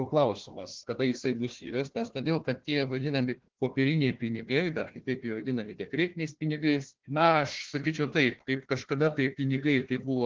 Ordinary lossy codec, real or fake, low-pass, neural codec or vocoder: Opus, 16 kbps; fake; 7.2 kHz; codec, 16 kHz, 1 kbps, FunCodec, trained on Chinese and English, 50 frames a second